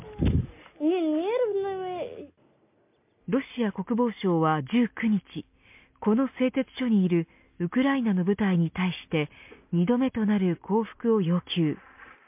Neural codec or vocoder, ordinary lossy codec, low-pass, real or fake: none; MP3, 32 kbps; 3.6 kHz; real